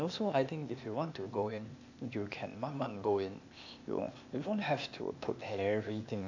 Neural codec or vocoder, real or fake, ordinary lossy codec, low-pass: codec, 16 kHz, 0.8 kbps, ZipCodec; fake; AAC, 48 kbps; 7.2 kHz